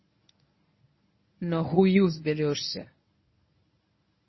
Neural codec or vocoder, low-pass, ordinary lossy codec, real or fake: codec, 24 kHz, 0.9 kbps, WavTokenizer, medium speech release version 1; 7.2 kHz; MP3, 24 kbps; fake